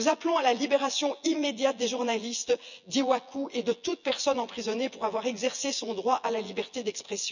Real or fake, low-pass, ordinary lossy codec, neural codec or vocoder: fake; 7.2 kHz; none; vocoder, 24 kHz, 100 mel bands, Vocos